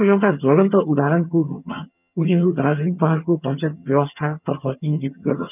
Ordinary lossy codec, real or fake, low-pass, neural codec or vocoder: none; fake; 3.6 kHz; vocoder, 22.05 kHz, 80 mel bands, HiFi-GAN